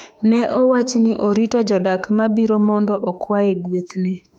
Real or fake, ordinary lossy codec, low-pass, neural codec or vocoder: fake; none; 19.8 kHz; autoencoder, 48 kHz, 32 numbers a frame, DAC-VAE, trained on Japanese speech